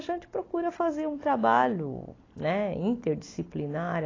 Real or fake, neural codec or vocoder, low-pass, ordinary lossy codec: real; none; 7.2 kHz; AAC, 32 kbps